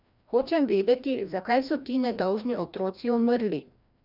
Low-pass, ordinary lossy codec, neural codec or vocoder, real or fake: 5.4 kHz; none; codec, 16 kHz, 1 kbps, FreqCodec, larger model; fake